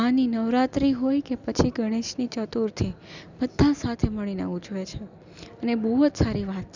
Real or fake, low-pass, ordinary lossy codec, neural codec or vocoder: real; 7.2 kHz; none; none